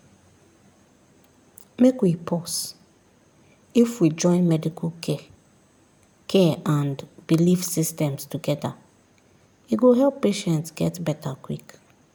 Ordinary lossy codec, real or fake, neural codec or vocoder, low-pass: none; real; none; none